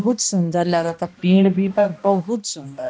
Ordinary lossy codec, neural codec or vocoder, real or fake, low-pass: none; codec, 16 kHz, 1 kbps, X-Codec, HuBERT features, trained on balanced general audio; fake; none